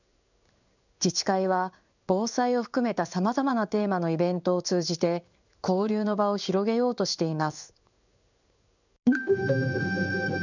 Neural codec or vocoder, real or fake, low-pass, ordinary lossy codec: codec, 16 kHz in and 24 kHz out, 1 kbps, XY-Tokenizer; fake; 7.2 kHz; none